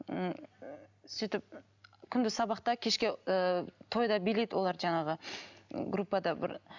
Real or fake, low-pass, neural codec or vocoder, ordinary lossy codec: real; 7.2 kHz; none; none